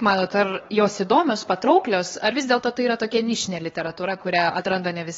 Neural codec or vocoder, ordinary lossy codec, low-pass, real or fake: codec, 16 kHz, 4 kbps, X-Codec, HuBERT features, trained on LibriSpeech; AAC, 24 kbps; 7.2 kHz; fake